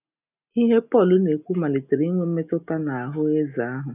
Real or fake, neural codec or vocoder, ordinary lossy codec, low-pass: real; none; none; 3.6 kHz